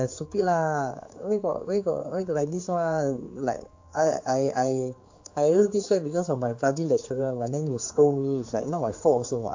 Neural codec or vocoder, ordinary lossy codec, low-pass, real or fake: codec, 16 kHz, 4 kbps, X-Codec, HuBERT features, trained on general audio; AAC, 48 kbps; 7.2 kHz; fake